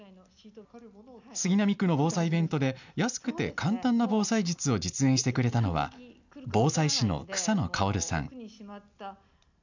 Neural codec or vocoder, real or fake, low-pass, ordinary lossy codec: none; real; 7.2 kHz; none